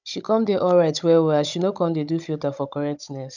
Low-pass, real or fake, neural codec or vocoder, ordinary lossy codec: 7.2 kHz; fake; codec, 16 kHz, 16 kbps, FunCodec, trained on Chinese and English, 50 frames a second; none